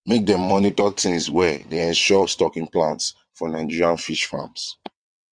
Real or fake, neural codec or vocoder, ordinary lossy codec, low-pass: fake; vocoder, 22.05 kHz, 80 mel bands, WaveNeXt; MP3, 64 kbps; 9.9 kHz